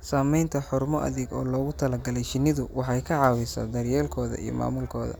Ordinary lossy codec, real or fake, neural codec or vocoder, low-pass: none; real; none; none